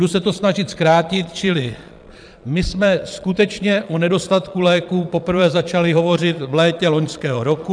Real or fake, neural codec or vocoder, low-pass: fake; vocoder, 22.05 kHz, 80 mel bands, Vocos; 9.9 kHz